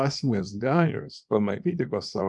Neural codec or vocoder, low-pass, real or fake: codec, 24 kHz, 0.9 kbps, WavTokenizer, small release; 10.8 kHz; fake